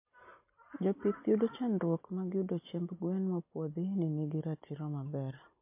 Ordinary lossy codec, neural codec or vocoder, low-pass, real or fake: MP3, 24 kbps; none; 3.6 kHz; real